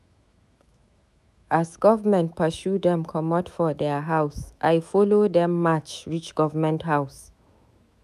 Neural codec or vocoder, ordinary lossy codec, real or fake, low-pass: autoencoder, 48 kHz, 128 numbers a frame, DAC-VAE, trained on Japanese speech; none; fake; 14.4 kHz